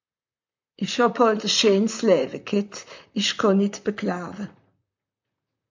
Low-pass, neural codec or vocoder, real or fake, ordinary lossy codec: 7.2 kHz; vocoder, 44.1 kHz, 128 mel bands, Pupu-Vocoder; fake; MP3, 64 kbps